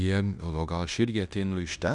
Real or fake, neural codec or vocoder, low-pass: fake; codec, 16 kHz in and 24 kHz out, 0.9 kbps, LongCat-Audio-Codec, fine tuned four codebook decoder; 10.8 kHz